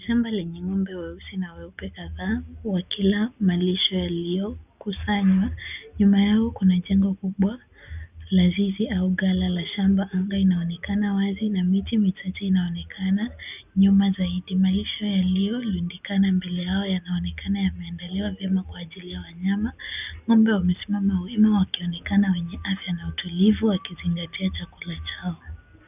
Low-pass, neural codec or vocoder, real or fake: 3.6 kHz; none; real